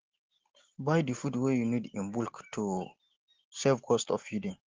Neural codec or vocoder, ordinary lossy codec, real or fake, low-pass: none; Opus, 16 kbps; real; 7.2 kHz